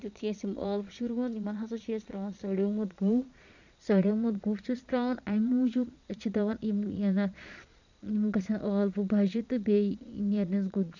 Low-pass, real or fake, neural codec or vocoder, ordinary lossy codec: 7.2 kHz; fake; vocoder, 22.05 kHz, 80 mel bands, Vocos; none